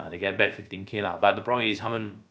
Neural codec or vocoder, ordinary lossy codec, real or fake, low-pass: codec, 16 kHz, about 1 kbps, DyCAST, with the encoder's durations; none; fake; none